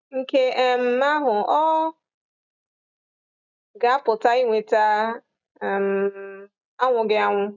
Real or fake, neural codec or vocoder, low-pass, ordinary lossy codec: real; none; 7.2 kHz; none